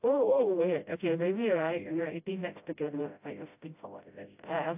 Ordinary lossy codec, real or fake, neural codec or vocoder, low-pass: AAC, 32 kbps; fake; codec, 16 kHz, 0.5 kbps, FreqCodec, smaller model; 3.6 kHz